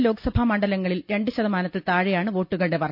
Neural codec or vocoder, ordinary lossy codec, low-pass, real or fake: none; none; 5.4 kHz; real